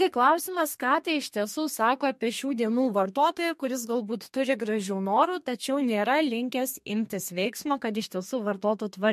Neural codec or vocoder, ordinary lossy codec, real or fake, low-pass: codec, 32 kHz, 1.9 kbps, SNAC; MP3, 64 kbps; fake; 14.4 kHz